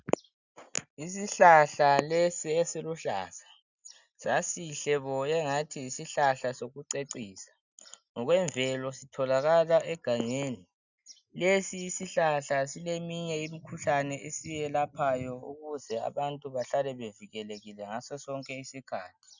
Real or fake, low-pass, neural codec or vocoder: real; 7.2 kHz; none